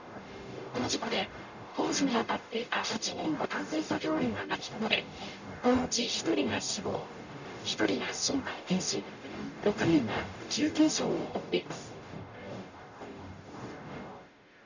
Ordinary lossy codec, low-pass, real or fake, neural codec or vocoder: none; 7.2 kHz; fake; codec, 44.1 kHz, 0.9 kbps, DAC